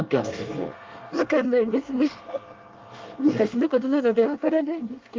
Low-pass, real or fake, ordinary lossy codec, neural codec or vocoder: 7.2 kHz; fake; Opus, 32 kbps; codec, 24 kHz, 1 kbps, SNAC